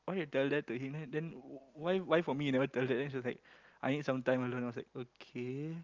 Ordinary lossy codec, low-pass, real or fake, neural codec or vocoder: Opus, 32 kbps; 7.2 kHz; real; none